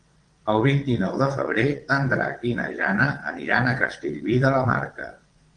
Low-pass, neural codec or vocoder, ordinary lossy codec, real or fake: 9.9 kHz; vocoder, 22.05 kHz, 80 mel bands, WaveNeXt; Opus, 24 kbps; fake